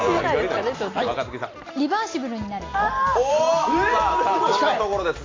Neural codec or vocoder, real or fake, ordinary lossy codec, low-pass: none; real; AAC, 32 kbps; 7.2 kHz